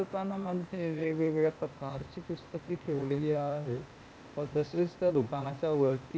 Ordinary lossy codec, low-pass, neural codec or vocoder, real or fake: none; none; codec, 16 kHz, 0.8 kbps, ZipCodec; fake